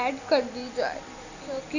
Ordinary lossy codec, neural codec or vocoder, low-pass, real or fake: none; none; 7.2 kHz; real